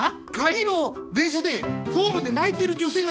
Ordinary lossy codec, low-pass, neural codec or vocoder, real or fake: none; none; codec, 16 kHz, 2 kbps, X-Codec, HuBERT features, trained on balanced general audio; fake